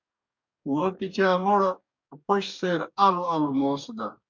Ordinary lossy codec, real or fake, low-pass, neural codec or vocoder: MP3, 64 kbps; fake; 7.2 kHz; codec, 44.1 kHz, 2.6 kbps, DAC